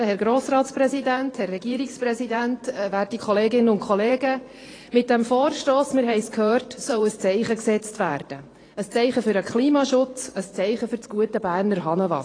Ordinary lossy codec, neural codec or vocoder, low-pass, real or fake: AAC, 32 kbps; vocoder, 44.1 kHz, 128 mel bands every 512 samples, BigVGAN v2; 9.9 kHz; fake